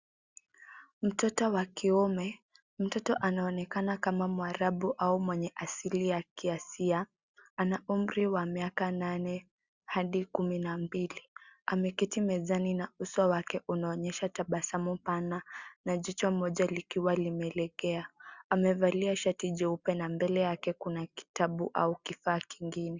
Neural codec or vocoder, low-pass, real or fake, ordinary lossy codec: none; 7.2 kHz; real; Opus, 64 kbps